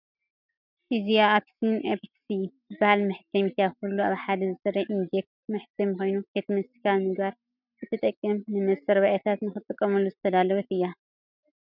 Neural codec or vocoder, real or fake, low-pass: none; real; 5.4 kHz